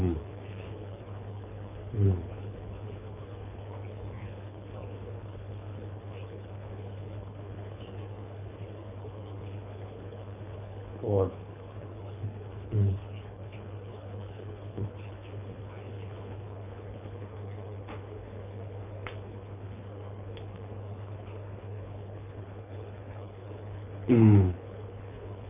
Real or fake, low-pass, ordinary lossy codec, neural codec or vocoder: fake; 3.6 kHz; none; codec, 24 kHz, 3 kbps, HILCodec